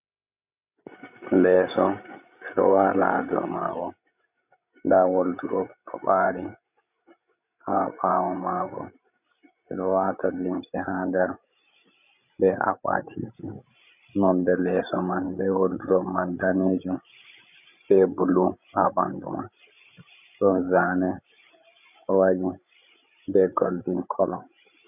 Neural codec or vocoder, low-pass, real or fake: codec, 16 kHz, 16 kbps, FreqCodec, larger model; 3.6 kHz; fake